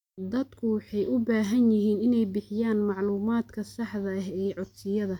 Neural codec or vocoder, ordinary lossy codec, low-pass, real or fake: none; none; 19.8 kHz; real